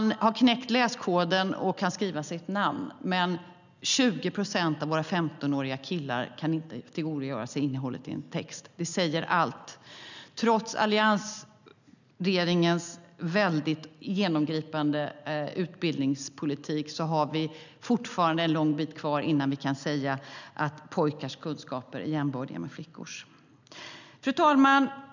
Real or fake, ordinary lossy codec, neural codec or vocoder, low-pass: real; none; none; 7.2 kHz